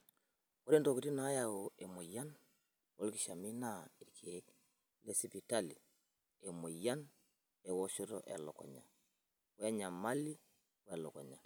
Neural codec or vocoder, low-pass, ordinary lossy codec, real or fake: none; none; none; real